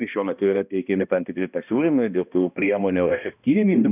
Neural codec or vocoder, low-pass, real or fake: codec, 16 kHz, 1 kbps, X-Codec, HuBERT features, trained on balanced general audio; 3.6 kHz; fake